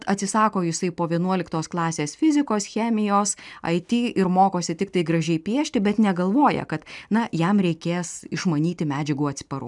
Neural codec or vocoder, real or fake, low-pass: none; real; 10.8 kHz